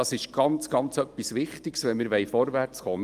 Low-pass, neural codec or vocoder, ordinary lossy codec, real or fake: 14.4 kHz; none; Opus, 32 kbps; real